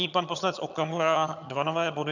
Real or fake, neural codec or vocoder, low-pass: fake; vocoder, 22.05 kHz, 80 mel bands, HiFi-GAN; 7.2 kHz